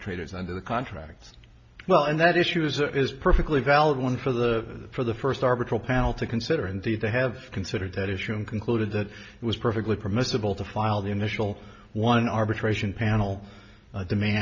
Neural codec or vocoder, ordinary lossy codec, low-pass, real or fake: none; MP3, 48 kbps; 7.2 kHz; real